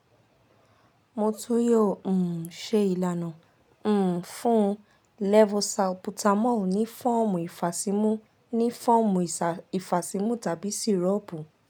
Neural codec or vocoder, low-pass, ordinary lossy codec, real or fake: none; none; none; real